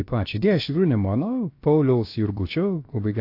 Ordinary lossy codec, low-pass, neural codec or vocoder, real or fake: AAC, 32 kbps; 5.4 kHz; codec, 16 kHz, about 1 kbps, DyCAST, with the encoder's durations; fake